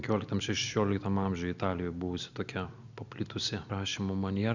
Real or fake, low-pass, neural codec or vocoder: real; 7.2 kHz; none